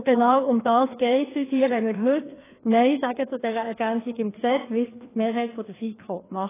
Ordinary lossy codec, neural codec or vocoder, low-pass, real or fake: AAC, 16 kbps; codec, 16 kHz, 2 kbps, FreqCodec, larger model; 3.6 kHz; fake